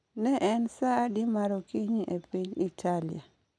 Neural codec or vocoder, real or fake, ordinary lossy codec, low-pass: none; real; none; none